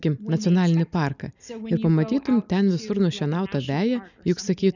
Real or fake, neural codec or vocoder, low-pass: real; none; 7.2 kHz